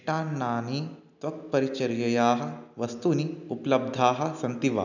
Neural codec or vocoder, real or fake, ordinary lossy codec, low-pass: none; real; none; 7.2 kHz